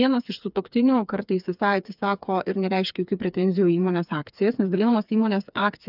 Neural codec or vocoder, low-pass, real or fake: codec, 16 kHz, 4 kbps, FreqCodec, smaller model; 5.4 kHz; fake